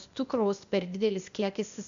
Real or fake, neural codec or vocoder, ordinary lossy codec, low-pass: fake; codec, 16 kHz, 0.8 kbps, ZipCodec; AAC, 96 kbps; 7.2 kHz